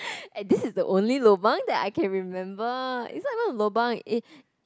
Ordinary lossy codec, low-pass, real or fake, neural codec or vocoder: none; none; real; none